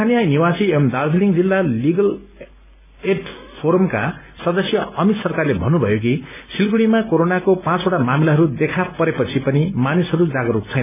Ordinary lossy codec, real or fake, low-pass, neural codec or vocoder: none; real; 3.6 kHz; none